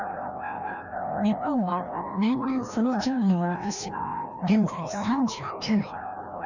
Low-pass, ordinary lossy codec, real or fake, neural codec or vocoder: 7.2 kHz; none; fake; codec, 16 kHz, 1 kbps, FreqCodec, larger model